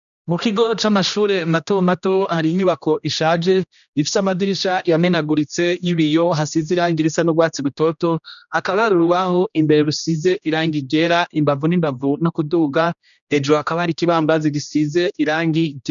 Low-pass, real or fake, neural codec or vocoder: 7.2 kHz; fake; codec, 16 kHz, 1 kbps, X-Codec, HuBERT features, trained on general audio